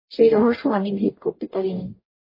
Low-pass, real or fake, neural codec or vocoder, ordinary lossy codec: 5.4 kHz; fake; codec, 44.1 kHz, 0.9 kbps, DAC; MP3, 24 kbps